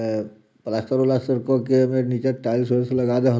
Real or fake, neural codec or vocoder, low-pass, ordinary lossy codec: real; none; none; none